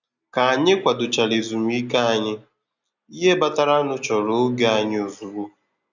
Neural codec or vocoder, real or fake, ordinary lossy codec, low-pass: none; real; none; 7.2 kHz